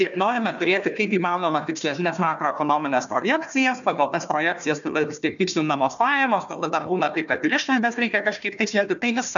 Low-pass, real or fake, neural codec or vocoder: 7.2 kHz; fake; codec, 16 kHz, 1 kbps, FunCodec, trained on Chinese and English, 50 frames a second